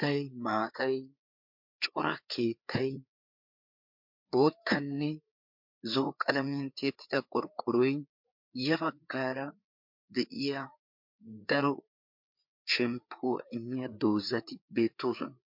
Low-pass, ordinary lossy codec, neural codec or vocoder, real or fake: 5.4 kHz; MP3, 48 kbps; codec, 16 kHz, 4 kbps, FreqCodec, larger model; fake